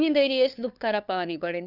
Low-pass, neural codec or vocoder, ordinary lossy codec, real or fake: 5.4 kHz; codec, 16 kHz, 2 kbps, FunCodec, trained on LibriTTS, 25 frames a second; none; fake